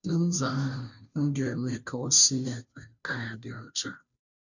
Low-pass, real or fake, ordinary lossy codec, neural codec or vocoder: 7.2 kHz; fake; none; codec, 16 kHz, 0.5 kbps, FunCodec, trained on Chinese and English, 25 frames a second